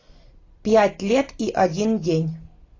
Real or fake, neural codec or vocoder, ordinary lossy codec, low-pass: real; none; AAC, 32 kbps; 7.2 kHz